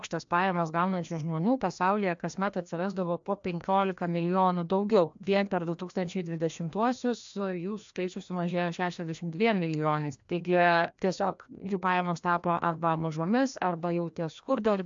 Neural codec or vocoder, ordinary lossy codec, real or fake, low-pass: codec, 16 kHz, 1 kbps, FreqCodec, larger model; AAC, 64 kbps; fake; 7.2 kHz